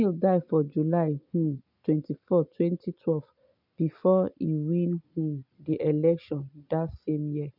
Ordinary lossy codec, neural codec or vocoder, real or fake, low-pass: none; none; real; 5.4 kHz